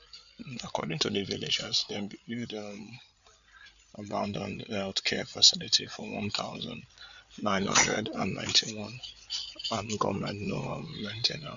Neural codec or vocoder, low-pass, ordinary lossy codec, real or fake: codec, 16 kHz, 8 kbps, FreqCodec, larger model; 7.2 kHz; none; fake